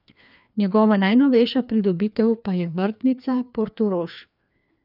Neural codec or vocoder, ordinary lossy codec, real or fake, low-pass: codec, 16 kHz, 2 kbps, FreqCodec, larger model; none; fake; 5.4 kHz